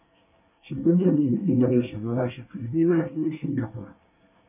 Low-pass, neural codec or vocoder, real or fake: 3.6 kHz; codec, 24 kHz, 1 kbps, SNAC; fake